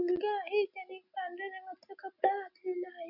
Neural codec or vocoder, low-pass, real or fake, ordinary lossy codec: none; 5.4 kHz; real; none